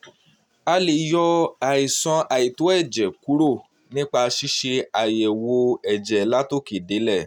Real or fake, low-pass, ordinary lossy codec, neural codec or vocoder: real; 19.8 kHz; none; none